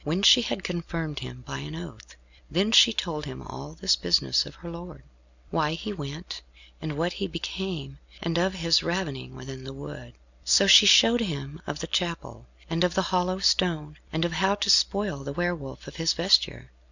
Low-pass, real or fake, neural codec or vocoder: 7.2 kHz; real; none